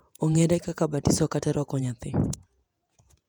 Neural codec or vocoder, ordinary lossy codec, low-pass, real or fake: vocoder, 48 kHz, 128 mel bands, Vocos; none; 19.8 kHz; fake